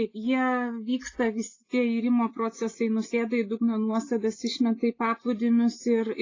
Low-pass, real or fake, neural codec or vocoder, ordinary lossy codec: 7.2 kHz; real; none; AAC, 32 kbps